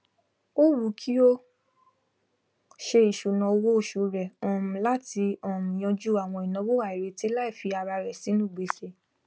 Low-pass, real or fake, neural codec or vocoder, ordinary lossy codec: none; real; none; none